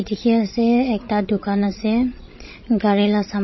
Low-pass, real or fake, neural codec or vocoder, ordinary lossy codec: 7.2 kHz; fake; codec, 16 kHz, 16 kbps, FreqCodec, larger model; MP3, 24 kbps